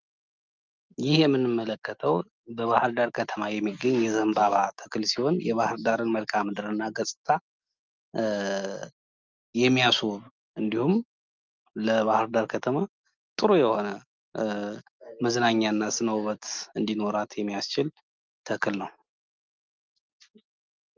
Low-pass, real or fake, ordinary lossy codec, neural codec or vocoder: 7.2 kHz; real; Opus, 32 kbps; none